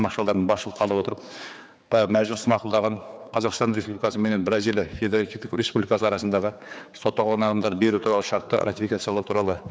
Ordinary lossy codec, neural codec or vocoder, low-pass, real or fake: none; codec, 16 kHz, 4 kbps, X-Codec, HuBERT features, trained on general audio; none; fake